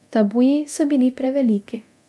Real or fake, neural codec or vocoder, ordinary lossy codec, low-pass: fake; codec, 24 kHz, 0.9 kbps, DualCodec; none; none